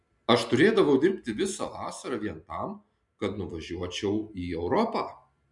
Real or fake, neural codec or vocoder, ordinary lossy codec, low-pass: real; none; MP3, 64 kbps; 10.8 kHz